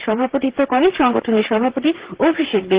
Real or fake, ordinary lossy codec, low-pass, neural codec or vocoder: fake; Opus, 16 kbps; 3.6 kHz; vocoder, 44.1 kHz, 128 mel bands, Pupu-Vocoder